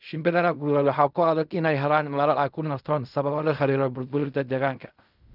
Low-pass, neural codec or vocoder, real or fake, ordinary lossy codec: 5.4 kHz; codec, 16 kHz in and 24 kHz out, 0.4 kbps, LongCat-Audio-Codec, fine tuned four codebook decoder; fake; none